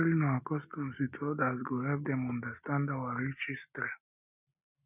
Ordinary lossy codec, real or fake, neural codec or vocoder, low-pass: none; real; none; 3.6 kHz